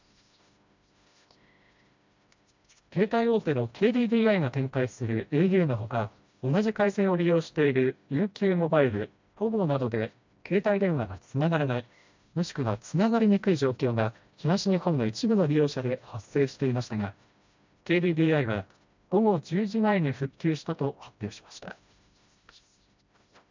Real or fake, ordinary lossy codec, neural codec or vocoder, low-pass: fake; MP3, 64 kbps; codec, 16 kHz, 1 kbps, FreqCodec, smaller model; 7.2 kHz